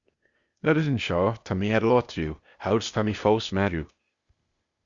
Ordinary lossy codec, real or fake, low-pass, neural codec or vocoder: Opus, 64 kbps; fake; 7.2 kHz; codec, 16 kHz, 0.8 kbps, ZipCodec